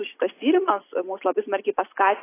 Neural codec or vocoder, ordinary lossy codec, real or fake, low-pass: none; AAC, 24 kbps; real; 3.6 kHz